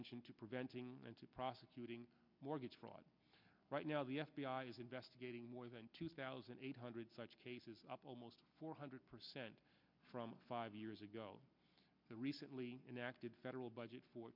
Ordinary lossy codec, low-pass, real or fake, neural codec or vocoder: MP3, 48 kbps; 5.4 kHz; real; none